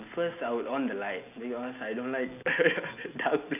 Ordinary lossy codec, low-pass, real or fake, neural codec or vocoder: none; 3.6 kHz; real; none